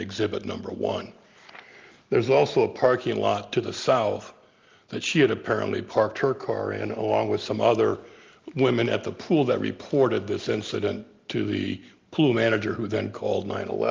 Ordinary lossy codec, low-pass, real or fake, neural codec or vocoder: Opus, 32 kbps; 7.2 kHz; real; none